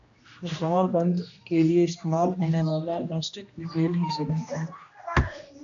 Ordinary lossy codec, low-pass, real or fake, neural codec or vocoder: AAC, 64 kbps; 7.2 kHz; fake; codec, 16 kHz, 1 kbps, X-Codec, HuBERT features, trained on general audio